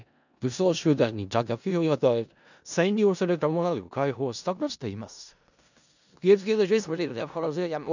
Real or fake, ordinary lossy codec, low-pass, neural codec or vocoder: fake; none; 7.2 kHz; codec, 16 kHz in and 24 kHz out, 0.4 kbps, LongCat-Audio-Codec, four codebook decoder